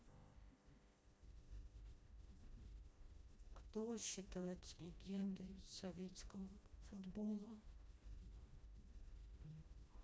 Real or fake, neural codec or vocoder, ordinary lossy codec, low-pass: fake; codec, 16 kHz, 1 kbps, FreqCodec, smaller model; none; none